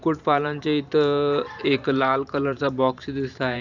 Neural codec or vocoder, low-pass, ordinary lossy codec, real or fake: vocoder, 44.1 kHz, 128 mel bands every 512 samples, BigVGAN v2; 7.2 kHz; none; fake